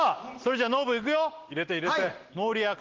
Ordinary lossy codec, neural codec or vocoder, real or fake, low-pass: Opus, 16 kbps; none; real; 7.2 kHz